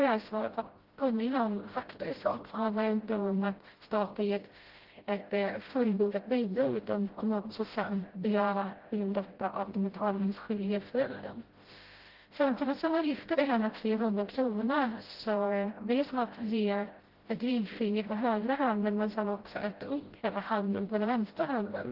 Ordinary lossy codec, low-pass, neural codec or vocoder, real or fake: Opus, 16 kbps; 5.4 kHz; codec, 16 kHz, 0.5 kbps, FreqCodec, smaller model; fake